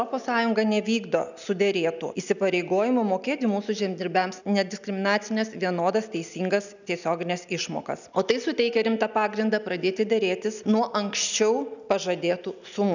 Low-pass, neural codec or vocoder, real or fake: 7.2 kHz; none; real